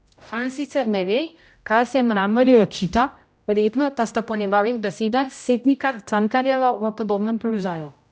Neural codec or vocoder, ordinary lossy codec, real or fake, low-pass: codec, 16 kHz, 0.5 kbps, X-Codec, HuBERT features, trained on general audio; none; fake; none